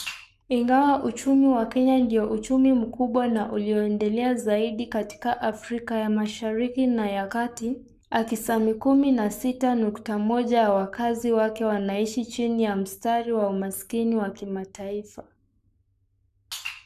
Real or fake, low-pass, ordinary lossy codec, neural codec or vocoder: fake; 14.4 kHz; none; codec, 44.1 kHz, 7.8 kbps, Pupu-Codec